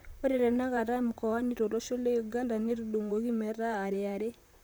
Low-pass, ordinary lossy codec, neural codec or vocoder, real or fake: none; none; vocoder, 44.1 kHz, 128 mel bands, Pupu-Vocoder; fake